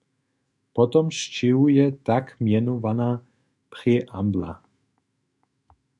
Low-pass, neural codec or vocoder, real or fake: 10.8 kHz; autoencoder, 48 kHz, 128 numbers a frame, DAC-VAE, trained on Japanese speech; fake